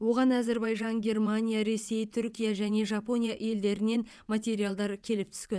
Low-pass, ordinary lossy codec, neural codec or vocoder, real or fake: none; none; vocoder, 22.05 kHz, 80 mel bands, WaveNeXt; fake